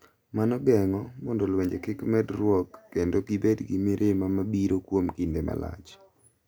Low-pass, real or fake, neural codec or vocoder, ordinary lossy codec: none; real; none; none